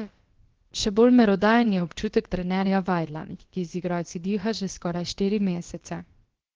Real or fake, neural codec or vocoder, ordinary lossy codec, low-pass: fake; codec, 16 kHz, about 1 kbps, DyCAST, with the encoder's durations; Opus, 16 kbps; 7.2 kHz